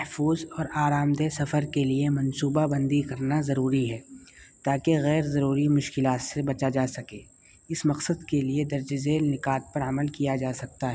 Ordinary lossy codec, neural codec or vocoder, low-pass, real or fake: none; none; none; real